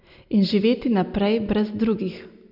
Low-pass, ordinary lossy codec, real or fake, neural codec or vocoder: 5.4 kHz; none; real; none